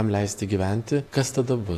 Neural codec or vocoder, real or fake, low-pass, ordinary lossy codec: vocoder, 48 kHz, 128 mel bands, Vocos; fake; 14.4 kHz; AAC, 64 kbps